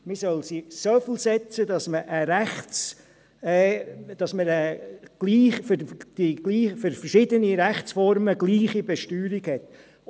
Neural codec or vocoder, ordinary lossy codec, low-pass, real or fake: none; none; none; real